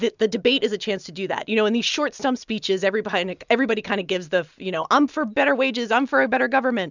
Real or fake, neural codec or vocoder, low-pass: real; none; 7.2 kHz